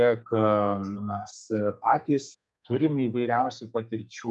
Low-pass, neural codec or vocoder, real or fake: 10.8 kHz; codec, 32 kHz, 1.9 kbps, SNAC; fake